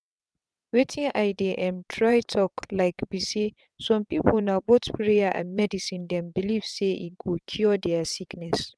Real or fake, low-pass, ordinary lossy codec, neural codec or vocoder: fake; none; none; vocoder, 22.05 kHz, 80 mel bands, WaveNeXt